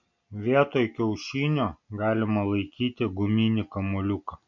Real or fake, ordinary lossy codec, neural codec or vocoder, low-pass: real; MP3, 48 kbps; none; 7.2 kHz